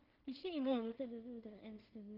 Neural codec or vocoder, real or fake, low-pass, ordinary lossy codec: codec, 16 kHz in and 24 kHz out, 0.4 kbps, LongCat-Audio-Codec, two codebook decoder; fake; 5.4 kHz; Opus, 24 kbps